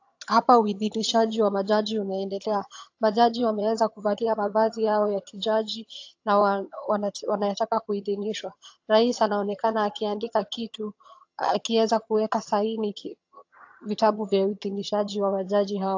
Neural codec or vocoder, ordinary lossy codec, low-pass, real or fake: vocoder, 22.05 kHz, 80 mel bands, HiFi-GAN; AAC, 48 kbps; 7.2 kHz; fake